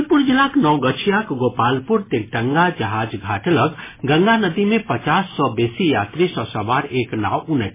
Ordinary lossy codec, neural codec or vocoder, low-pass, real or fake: MP3, 16 kbps; none; 3.6 kHz; real